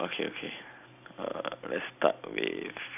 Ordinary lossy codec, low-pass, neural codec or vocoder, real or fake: none; 3.6 kHz; none; real